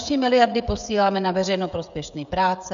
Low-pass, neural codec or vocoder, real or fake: 7.2 kHz; codec, 16 kHz, 16 kbps, FreqCodec, smaller model; fake